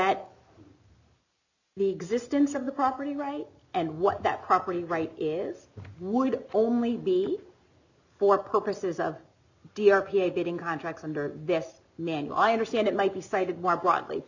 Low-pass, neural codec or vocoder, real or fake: 7.2 kHz; none; real